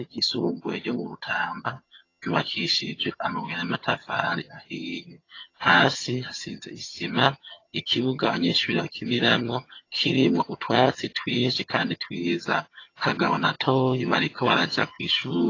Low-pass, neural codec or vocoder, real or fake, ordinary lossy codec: 7.2 kHz; vocoder, 22.05 kHz, 80 mel bands, HiFi-GAN; fake; AAC, 32 kbps